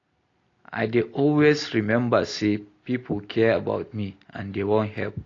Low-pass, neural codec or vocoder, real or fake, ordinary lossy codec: 7.2 kHz; none; real; AAC, 32 kbps